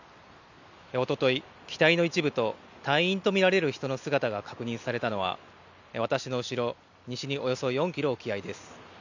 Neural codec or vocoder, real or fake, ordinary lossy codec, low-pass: none; real; none; 7.2 kHz